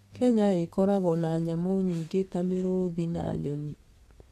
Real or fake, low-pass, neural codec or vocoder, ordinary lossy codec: fake; 14.4 kHz; codec, 32 kHz, 1.9 kbps, SNAC; none